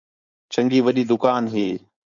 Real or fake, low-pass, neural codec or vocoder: fake; 7.2 kHz; codec, 16 kHz, 4.8 kbps, FACodec